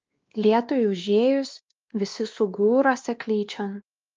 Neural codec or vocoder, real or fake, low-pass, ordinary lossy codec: codec, 16 kHz, 2 kbps, X-Codec, WavLM features, trained on Multilingual LibriSpeech; fake; 7.2 kHz; Opus, 24 kbps